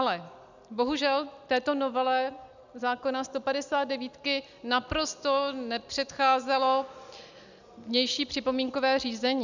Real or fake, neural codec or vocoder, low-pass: real; none; 7.2 kHz